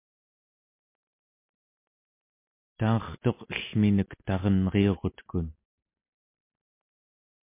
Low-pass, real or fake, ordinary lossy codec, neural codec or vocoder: 3.6 kHz; real; MP3, 24 kbps; none